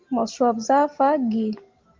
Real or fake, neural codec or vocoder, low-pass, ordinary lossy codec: real; none; 7.2 kHz; Opus, 32 kbps